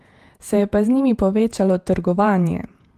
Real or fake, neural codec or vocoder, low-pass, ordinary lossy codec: fake; vocoder, 48 kHz, 128 mel bands, Vocos; 14.4 kHz; Opus, 24 kbps